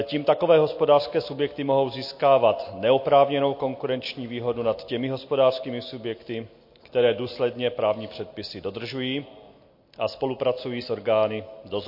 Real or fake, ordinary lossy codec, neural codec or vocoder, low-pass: real; MP3, 32 kbps; none; 5.4 kHz